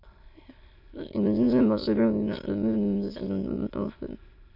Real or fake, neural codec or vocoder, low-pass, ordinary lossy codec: fake; autoencoder, 22.05 kHz, a latent of 192 numbers a frame, VITS, trained on many speakers; 5.4 kHz; MP3, 32 kbps